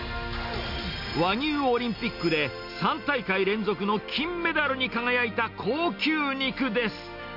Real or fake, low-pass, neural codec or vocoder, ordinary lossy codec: real; 5.4 kHz; none; none